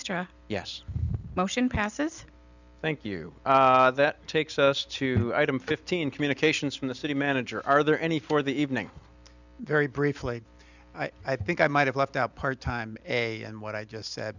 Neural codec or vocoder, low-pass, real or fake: none; 7.2 kHz; real